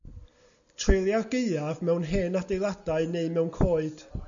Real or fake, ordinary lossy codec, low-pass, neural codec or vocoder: real; MP3, 96 kbps; 7.2 kHz; none